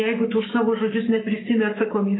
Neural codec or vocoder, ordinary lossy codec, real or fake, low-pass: codec, 44.1 kHz, 7.8 kbps, Pupu-Codec; AAC, 16 kbps; fake; 7.2 kHz